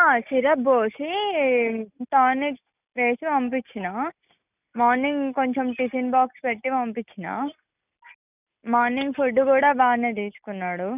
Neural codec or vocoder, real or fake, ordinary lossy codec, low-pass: none; real; none; 3.6 kHz